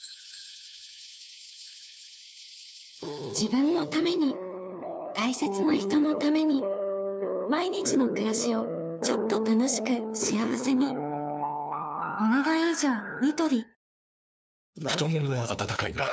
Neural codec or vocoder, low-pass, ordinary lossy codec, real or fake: codec, 16 kHz, 2 kbps, FunCodec, trained on LibriTTS, 25 frames a second; none; none; fake